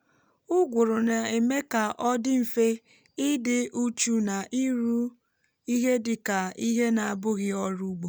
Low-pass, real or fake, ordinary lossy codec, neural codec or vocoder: none; real; none; none